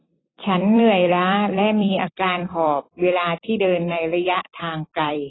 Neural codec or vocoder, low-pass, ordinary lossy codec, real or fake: vocoder, 44.1 kHz, 128 mel bands every 256 samples, BigVGAN v2; 7.2 kHz; AAC, 16 kbps; fake